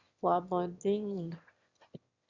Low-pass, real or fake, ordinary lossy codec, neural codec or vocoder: 7.2 kHz; fake; Opus, 64 kbps; autoencoder, 22.05 kHz, a latent of 192 numbers a frame, VITS, trained on one speaker